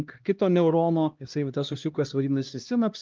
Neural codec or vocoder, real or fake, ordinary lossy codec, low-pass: codec, 16 kHz, 1 kbps, X-Codec, HuBERT features, trained on LibriSpeech; fake; Opus, 24 kbps; 7.2 kHz